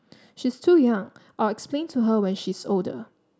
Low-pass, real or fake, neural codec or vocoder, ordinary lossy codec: none; real; none; none